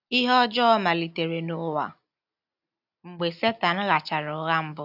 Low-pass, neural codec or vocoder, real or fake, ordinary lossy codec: 5.4 kHz; none; real; AAC, 48 kbps